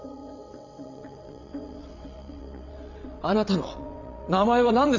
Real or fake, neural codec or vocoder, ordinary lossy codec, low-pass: fake; vocoder, 22.05 kHz, 80 mel bands, WaveNeXt; none; 7.2 kHz